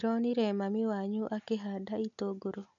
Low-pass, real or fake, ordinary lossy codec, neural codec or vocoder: 7.2 kHz; real; none; none